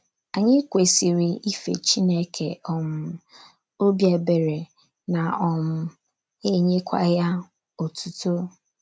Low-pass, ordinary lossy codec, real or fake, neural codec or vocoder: none; none; real; none